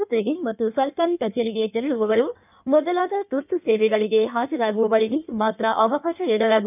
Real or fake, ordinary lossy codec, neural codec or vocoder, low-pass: fake; none; codec, 16 kHz in and 24 kHz out, 1.1 kbps, FireRedTTS-2 codec; 3.6 kHz